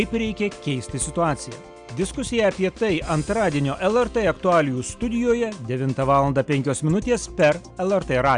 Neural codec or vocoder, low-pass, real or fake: none; 9.9 kHz; real